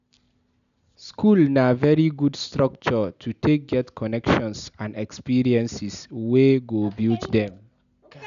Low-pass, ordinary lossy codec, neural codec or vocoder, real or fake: 7.2 kHz; none; none; real